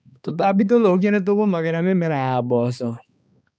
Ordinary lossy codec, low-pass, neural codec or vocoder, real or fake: none; none; codec, 16 kHz, 2 kbps, X-Codec, HuBERT features, trained on balanced general audio; fake